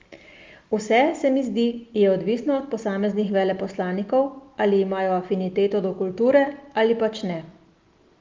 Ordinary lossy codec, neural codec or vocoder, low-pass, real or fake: Opus, 32 kbps; none; 7.2 kHz; real